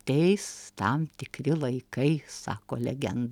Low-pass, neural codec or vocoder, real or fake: 19.8 kHz; none; real